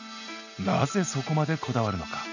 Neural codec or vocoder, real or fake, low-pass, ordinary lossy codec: none; real; 7.2 kHz; none